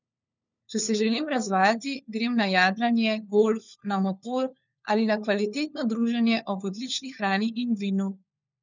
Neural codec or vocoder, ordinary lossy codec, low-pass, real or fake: codec, 16 kHz, 4 kbps, FunCodec, trained on LibriTTS, 50 frames a second; none; 7.2 kHz; fake